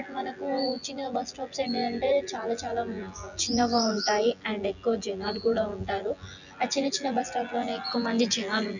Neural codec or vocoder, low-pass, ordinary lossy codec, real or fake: vocoder, 24 kHz, 100 mel bands, Vocos; 7.2 kHz; none; fake